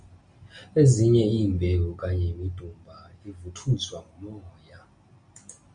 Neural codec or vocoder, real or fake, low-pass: none; real; 9.9 kHz